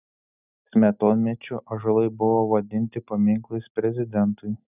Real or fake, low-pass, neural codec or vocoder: real; 3.6 kHz; none